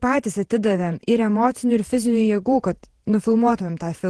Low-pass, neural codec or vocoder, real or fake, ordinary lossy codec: 10.8 kHz; vocoder, 48 kHz, 128 mel bands, Vocos; fake; Opus, 16 kbps